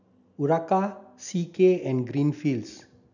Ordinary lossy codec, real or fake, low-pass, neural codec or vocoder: none; real; 7.2 kHz; none